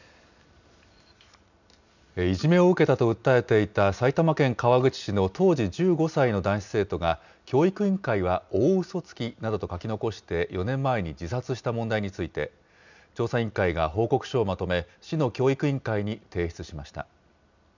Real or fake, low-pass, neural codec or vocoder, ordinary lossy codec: real; 7.2 kHz; none; none